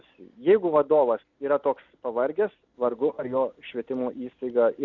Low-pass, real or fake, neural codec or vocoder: 7.2 kHz; real; none